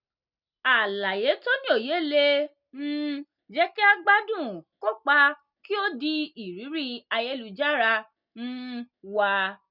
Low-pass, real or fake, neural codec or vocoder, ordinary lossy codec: 5.4 kHz; real; none; none